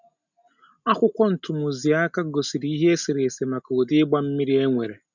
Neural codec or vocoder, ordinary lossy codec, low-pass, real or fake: none; none; 7.2 kHz; real